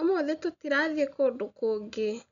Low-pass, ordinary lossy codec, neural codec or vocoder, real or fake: 7.2 kHz; none; none; real